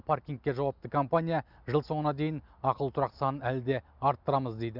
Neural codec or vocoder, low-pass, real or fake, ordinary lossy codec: none; 5.4 kHz; real; none